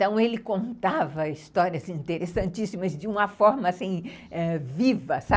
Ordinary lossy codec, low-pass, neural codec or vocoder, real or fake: none; none; none; real